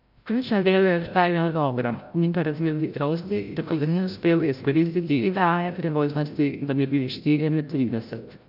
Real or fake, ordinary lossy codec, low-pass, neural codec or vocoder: fake; AAC, 48 kbps; 5.4 kHz; codec, 16 kHz, 0.5 kbps, FreqCodec, larger model